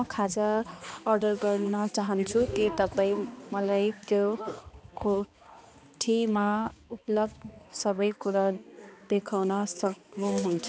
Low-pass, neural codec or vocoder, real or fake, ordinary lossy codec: none; codec, 16 kHz, 2 kbps, X-Codec, HuBERT features, trained on balanced general audio; fake; none